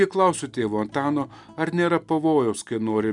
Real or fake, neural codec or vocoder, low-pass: real; none; 10.8 kHz